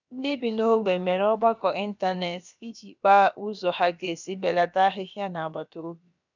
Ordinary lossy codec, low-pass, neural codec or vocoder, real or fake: none; 7.2 kHz; codec, 16 kHz, about 1 kbps, DyCAST, with the encoder's durations; fake